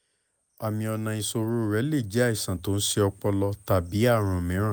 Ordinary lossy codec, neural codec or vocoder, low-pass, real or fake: none; none; none; real